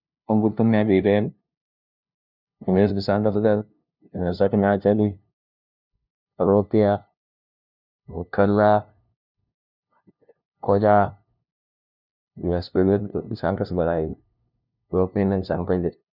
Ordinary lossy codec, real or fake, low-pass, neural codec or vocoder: none; fake; 5.4 kHz; codec, 16 kHz, 0.5 kbps, FunCodec, trained on LibriTTS, 25 frames a second